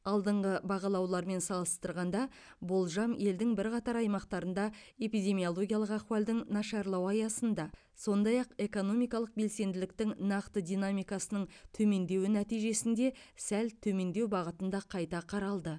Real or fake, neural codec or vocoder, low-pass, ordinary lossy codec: real; none; 9.9 kHz; none